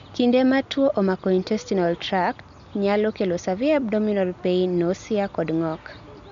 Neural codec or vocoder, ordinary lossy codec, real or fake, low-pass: none; none; real; 7.2 kHz